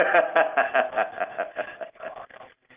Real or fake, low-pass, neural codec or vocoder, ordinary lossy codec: real; 3.6 kHz; none; Opus, 32 kbps